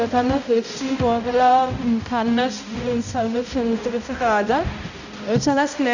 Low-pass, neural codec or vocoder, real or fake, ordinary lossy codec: 7.2 kHz; codec, 16 kHz, 0.5 kbps, X-Codec, HuBERT features, trained on balanced general audio; fake; none